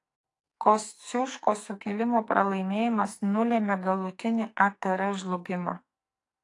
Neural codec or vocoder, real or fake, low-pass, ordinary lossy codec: codec, 44.1 kHz, 2.6 kbps, SNAC; fake; 10.8 kHz; AAC, 48 kbps